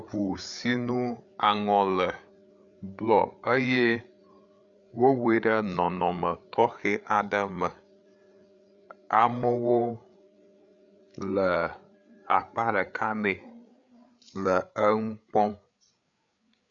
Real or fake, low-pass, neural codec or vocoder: fake; 7.2 kHz; codec, 16 kHz, 8 kbps, FreqCodec, larger model